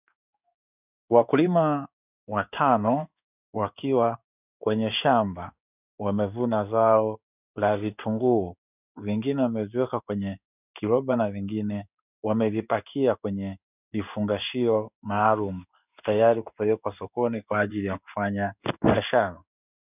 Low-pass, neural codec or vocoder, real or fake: 3.6 kHz; codec, 16 kHz in and 24 kHz out, 1 kbps, XY-Tokenizer; fake